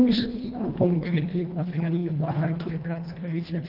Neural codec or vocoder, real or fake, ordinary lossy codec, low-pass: codec, 24 kHz, 1.5 kbps, HILCodec; fake; Opus, 32 kbps; 5.4 kHz